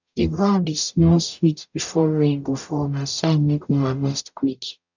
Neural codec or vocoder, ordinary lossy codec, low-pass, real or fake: codec, 44.1 kHz, 0.9 kbps, DAC; none; 7.2 kHz; fake